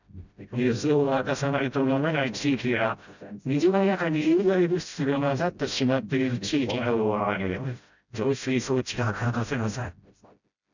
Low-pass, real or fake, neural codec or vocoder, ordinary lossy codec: 7.2 kHz; fake; codec, 16 kHz, 0.5 kbps, FreqCodec, smaller model; none